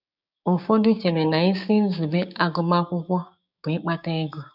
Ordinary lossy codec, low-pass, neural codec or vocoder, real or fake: none; 5.4 kHz; codec, 16 kHz, 6 kbps, DAC; fake